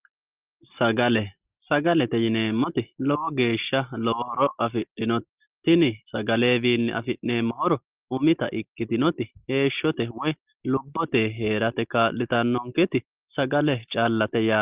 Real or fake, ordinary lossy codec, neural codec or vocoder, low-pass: real; Opus, 32 kbps; none; 3.6 kHz